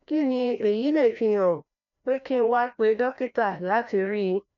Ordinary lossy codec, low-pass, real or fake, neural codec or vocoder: none; 7.2 kHz; fake; codec, 16 kHz, 1 kbps, FreqCodec, larger model